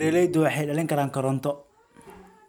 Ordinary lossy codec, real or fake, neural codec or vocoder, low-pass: none; real; none; 19.8 kHz